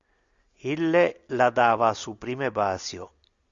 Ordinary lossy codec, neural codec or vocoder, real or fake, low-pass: Opus, 64 kbps; none; real; 7.2 kHz